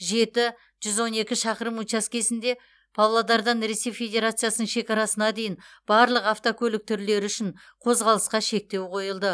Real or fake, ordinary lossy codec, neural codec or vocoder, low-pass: real; none; none; none